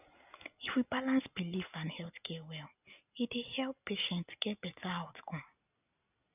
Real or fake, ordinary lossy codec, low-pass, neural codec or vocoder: real; none; 3.6 kHz; none